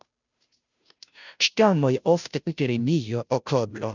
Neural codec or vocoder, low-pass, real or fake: codec, 16 kHz, 0.5 kbps, FunCodec, trained on Chinese and English, 25 frames a second; 7.2 kHz; fake